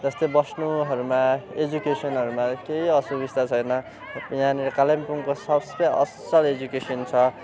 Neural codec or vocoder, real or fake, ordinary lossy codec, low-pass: none; real; none; none